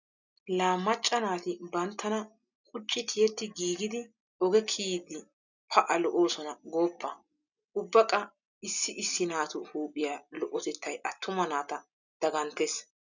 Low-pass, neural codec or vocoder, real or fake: 7.2 kHz; none; real